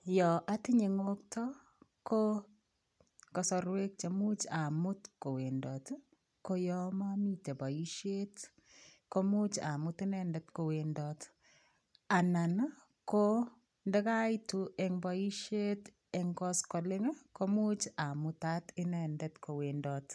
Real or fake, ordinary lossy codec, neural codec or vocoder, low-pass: real; none; none; none